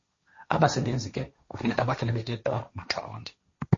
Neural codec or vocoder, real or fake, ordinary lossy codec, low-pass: codec, 16 kHz, 1.1 kbps, Voila-Tokenizer; fake; MP3, 32 kbps; 7.2 kHz